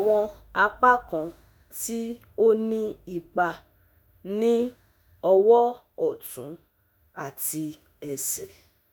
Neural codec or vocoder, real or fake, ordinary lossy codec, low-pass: autoencoder, 48 kHz, 32 numbers a frame, DAC-VAE, trained on Japanese speech; fake; none; none